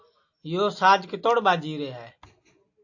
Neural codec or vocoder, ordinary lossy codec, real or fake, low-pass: none; AAC, 48 kbps; real; 7.2 kHz